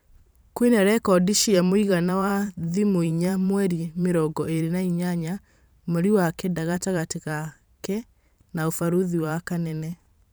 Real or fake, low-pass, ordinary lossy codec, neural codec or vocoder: fake; none; none; vocoder, 44.1 kHz, 128 mel bands every 512 samples, BigVGAN v2